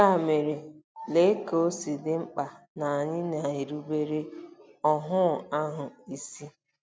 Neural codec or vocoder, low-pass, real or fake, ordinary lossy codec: none; none; real; none